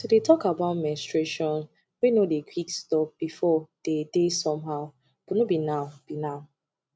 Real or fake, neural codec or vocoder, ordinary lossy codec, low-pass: real; none; none; none